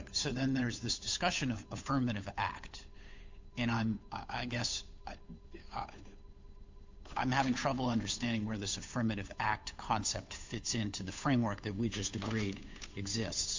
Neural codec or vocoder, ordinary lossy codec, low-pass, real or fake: codec, 16 kHz, 8 kbps, FunCodec, trained on Chinese and English, 25 frames a second; MP3, 64 kbps; 7.2 kHz; fake